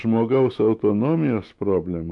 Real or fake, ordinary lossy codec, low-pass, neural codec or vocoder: fake; AAC, 64 kbps; 10.8 kHz; autoencoder, 48 kHz, 128 numbers a frame, DAC-VAE, trained on Japanese speech